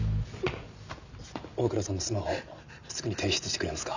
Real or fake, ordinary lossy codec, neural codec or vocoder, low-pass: real; none; none; 7.2 kHz